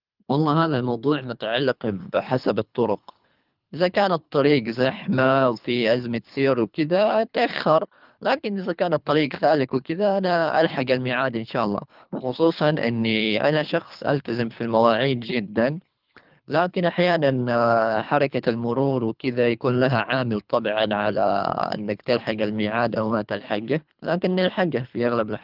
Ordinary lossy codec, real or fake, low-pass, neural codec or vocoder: Opus, 24 kbps; fake; 5.4 kHz; codec, 24 kHz, 3 kbps, HILCodec